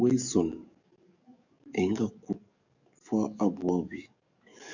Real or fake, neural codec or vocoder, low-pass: fake; codec, 44.1 kHz, 7.8 kbps, DAC; 7.2 kHz